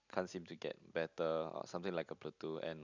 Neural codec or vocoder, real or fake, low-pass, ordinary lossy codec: none; real; 7.2 kHz; none